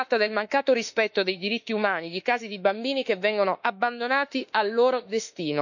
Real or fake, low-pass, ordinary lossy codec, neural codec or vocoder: fake; 7.2 kHz; none; autoencoder, 48 kHz, 32 numbers a frame, DAC-VAE, trained on Japanese speech